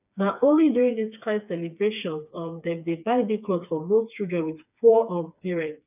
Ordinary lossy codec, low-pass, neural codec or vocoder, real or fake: none; 3.6 kHz; codec, 16 kHz, 4 kbps, FreqCodec, smaller model; fake